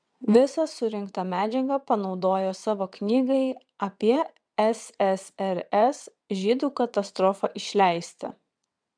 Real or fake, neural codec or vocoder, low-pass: fake; vocoder, 22.05 kHz, 80 mel bands, WaveNeXt; 9.9 kHz